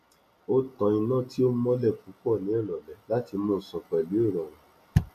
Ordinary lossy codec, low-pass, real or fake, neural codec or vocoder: none; 14.4 kHz; real; none